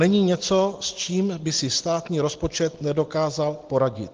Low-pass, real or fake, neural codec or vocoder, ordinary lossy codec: 7.2 kHz; real; none; Opus, 16 kbps